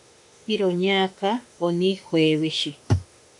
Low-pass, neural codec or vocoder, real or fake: 10.8 kHz; autoencoder, 48 kHz, 32 numbers a frame, DAC-VAE, trained on Japanese speech; fake